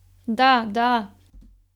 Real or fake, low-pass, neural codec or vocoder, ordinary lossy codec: real; 19.8 kHz; none; none